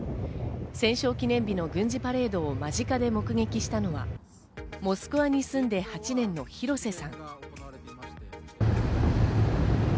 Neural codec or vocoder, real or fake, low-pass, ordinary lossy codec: none; real; none; none